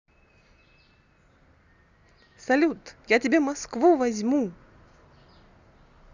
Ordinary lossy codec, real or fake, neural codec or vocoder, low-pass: Opus, 64 kbps; real; none; 7.2 kHz